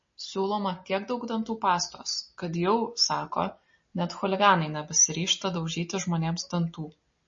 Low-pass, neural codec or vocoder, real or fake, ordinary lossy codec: 7.2 kHz; none; real; MP3, 32 kbps